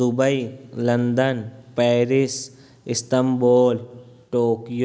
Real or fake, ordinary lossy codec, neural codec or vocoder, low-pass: real; none; none; none